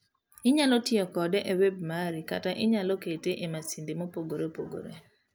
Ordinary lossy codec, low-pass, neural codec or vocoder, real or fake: none; none; none; real